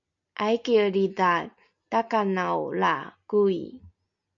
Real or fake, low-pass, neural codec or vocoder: real; 7.2 kHz; none